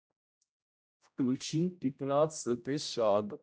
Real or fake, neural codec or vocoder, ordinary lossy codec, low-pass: fake; codec, 16 kHz, 0.5 kbps, X-Codec, HuBERT features, trained on general audio; none; none